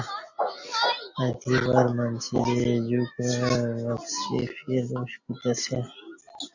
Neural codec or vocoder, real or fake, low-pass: none; real; 7.2 kHz